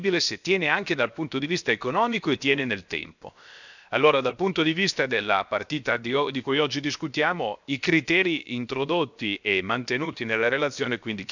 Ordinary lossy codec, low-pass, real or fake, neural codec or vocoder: none; 7.2 kHz; fake; codec, 16 kHz, 0.7 kbps, FocalCodec